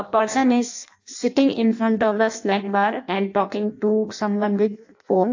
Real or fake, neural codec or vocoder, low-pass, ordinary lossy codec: fake; codec, 16 kHz in and 24 kHz out, 0.6 kbps, FireRedTTS-2 codec; 7.2 kHz; AAC, 48 kbps